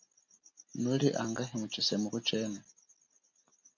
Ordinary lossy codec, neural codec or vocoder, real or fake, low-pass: MP3, 48 kbps; none; real; 7.2 kHz